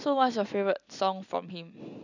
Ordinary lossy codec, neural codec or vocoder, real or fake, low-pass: none; none; real; 7.2 kHz